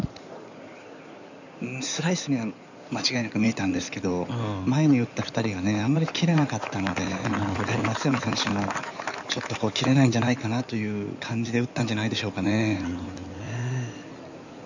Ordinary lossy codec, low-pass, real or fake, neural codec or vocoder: none; 7.2 kHz; fake; codec, 16 kHz in and 24 kHz out, 2.2 kbps, FireRedTTS-2 codec